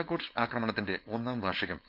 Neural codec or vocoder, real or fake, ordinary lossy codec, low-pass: codec, 16 kHz, 4.8 kbps, FACodec; fake; none; 5.4 kHz